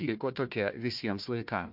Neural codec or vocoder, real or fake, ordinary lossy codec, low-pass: codec, 16 kHz, 1 kbps, FunCodec, trained on Chinese and English, 50 frames a second; fake; MP3, 48 kbps; 5.4 kHz